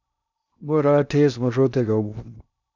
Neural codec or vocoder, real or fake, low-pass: codec, 16 kHz in and 24 kHz out, 0.6 kbps, FocalCodec, streaming, 2048 codes; fake; 7.2 kHz